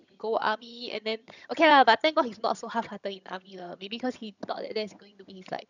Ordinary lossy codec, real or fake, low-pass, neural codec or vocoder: none; fake; 7.2 kHz; vocoder, 22.05 kHz, 80 mel bands, HiFi-GAN